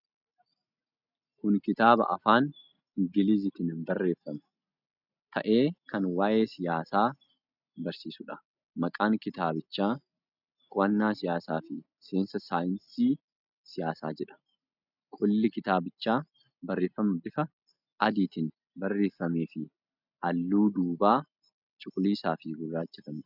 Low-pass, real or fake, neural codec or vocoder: 5.4 kHz; real; none